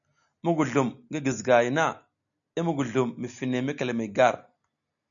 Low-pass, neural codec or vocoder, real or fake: 7.2 kHz; none; real